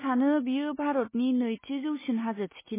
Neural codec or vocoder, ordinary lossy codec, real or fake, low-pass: none; MP3, 16 kbps; real; 3.6 kHz